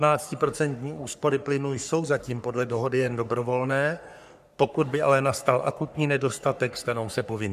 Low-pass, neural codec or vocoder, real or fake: 14.4 kHz; codec, 44.1 kHz, 3.4 kbps, Pupu-Codec; fake